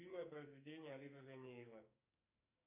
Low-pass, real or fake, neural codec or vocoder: 3.6 kHz; fake; codec, 44.1 kHz, 2.6 kbps, SNAC